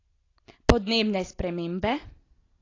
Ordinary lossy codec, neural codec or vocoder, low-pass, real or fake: AAC, 32 kbps; none; 7.2 kHz; real